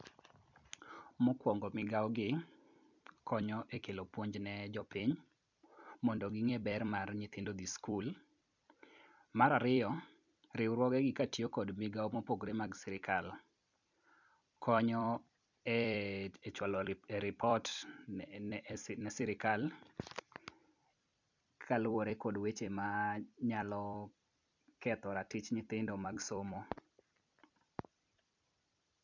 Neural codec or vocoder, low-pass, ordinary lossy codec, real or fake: vocoder, 44.1 kHz, 128 mel bands every 256 samples, BigVGAN v2; 7.2 kHz; none; fake